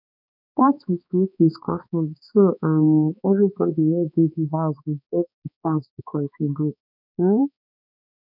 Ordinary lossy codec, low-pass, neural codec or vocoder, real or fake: none; 5.4 kHz; codec, 16 kHz, 2 kbps, X-Codec, HuBERT features, trained on balanced general audio; fake